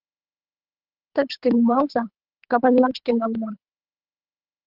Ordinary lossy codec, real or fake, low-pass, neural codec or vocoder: Opus, 24 kbps; fake; 5.4 kHz; codec, 24 kHz, 3 kbps, HILCodec